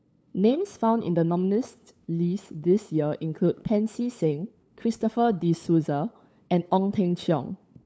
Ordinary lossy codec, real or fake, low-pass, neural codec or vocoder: none; fake; none; codec, 16 kHz, 8 kbps, FunCodec, trained on LibriTTS, 25 frames a second